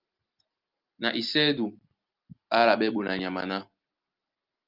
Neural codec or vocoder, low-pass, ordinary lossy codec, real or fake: none; 5.4 kHz; Opus, 24 kbps; real